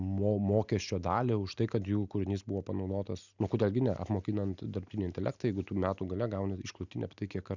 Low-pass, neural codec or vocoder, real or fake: 7.2 kHz; none; real